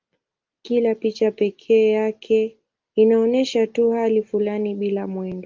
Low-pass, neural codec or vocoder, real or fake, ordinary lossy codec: 7.2 kHz; none; real; Opus, 32 kbps